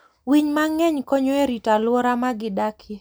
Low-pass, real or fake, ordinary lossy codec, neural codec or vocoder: none; real; none; none